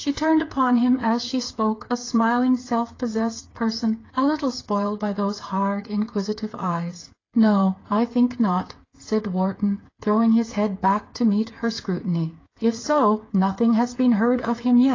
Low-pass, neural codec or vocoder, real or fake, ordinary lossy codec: 7.2 kHz; codec, 16 kHz, 8 kbps, FreqCodec, smaller model; fake; AAC, 32 kbps